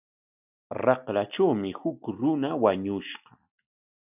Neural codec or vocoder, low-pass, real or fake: none; 3.6 kHz; real